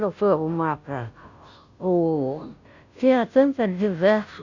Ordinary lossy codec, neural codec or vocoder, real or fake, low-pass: none; codec, 16 kHz, 0.5 kbps, FunCodec, trained on Chinese and English, 25 frames a second; fake; 7.2 kHz